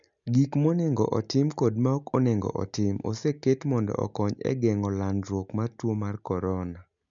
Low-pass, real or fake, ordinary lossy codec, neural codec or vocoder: 7.2 kHz; real; none; none